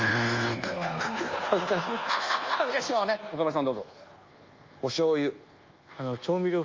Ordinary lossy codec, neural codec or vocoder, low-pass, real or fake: Opus, 32 kbps; codec, 24 kHz, 1.2 kbps, DualCodec; 7.2 kHz; fake